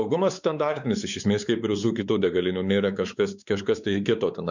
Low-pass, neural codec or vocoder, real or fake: 7.2 kHz; codec, 16 kHz, 4 kbps, X-Codec, WavLM features, trained on Multilingual LibriSpeech; fake